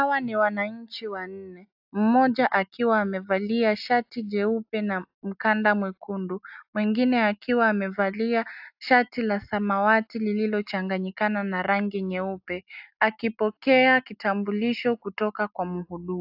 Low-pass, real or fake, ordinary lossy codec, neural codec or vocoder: 5.4 kHz; real; AAC, 48 kbps; none